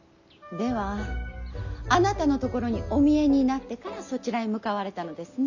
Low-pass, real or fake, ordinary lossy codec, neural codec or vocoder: 7.2 kHz; real; none; none